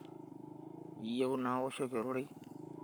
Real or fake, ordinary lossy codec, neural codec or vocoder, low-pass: fake; none; codec, 44.1 kHz, 7.8 kbps, Pupu-Codec; none